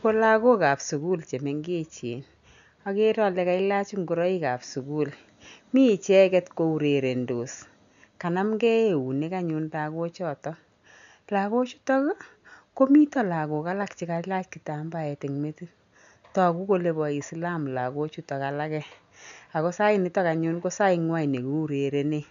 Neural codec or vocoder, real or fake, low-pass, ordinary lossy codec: none; real; 7.2 kHz; none